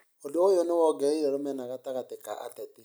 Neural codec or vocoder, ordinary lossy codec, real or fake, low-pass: none; none; real; none